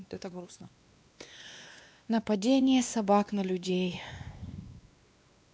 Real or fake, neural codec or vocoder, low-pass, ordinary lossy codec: fake; codec, 16 kHz, 2 kbps, X-Codec, WavLM features, trained on Multilingual LibriSpeech; none; none